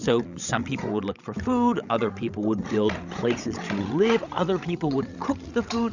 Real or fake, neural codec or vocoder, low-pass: fake; codec, 16 kHz, 16 kbps, FreqCodec, larger model; 7.2 kHz